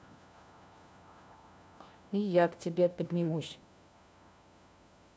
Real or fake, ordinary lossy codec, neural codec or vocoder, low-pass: fake; none; codec, 16 kHz, 1 kbps, FunCodec, trained on LibriTTS, 50 frames a second; none